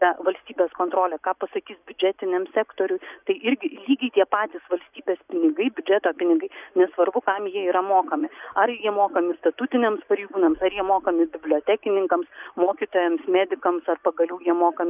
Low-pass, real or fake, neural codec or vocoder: 3.6 kHz; real; none